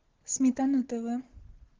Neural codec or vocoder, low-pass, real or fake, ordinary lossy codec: none; 7.2 kHz; real; Opus, 16 kbps